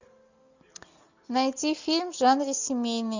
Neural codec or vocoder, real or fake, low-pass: none; real; 7.2 kHz